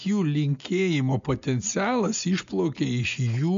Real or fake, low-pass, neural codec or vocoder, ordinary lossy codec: real; 7.2 kHz; none; MP3, 64 kbps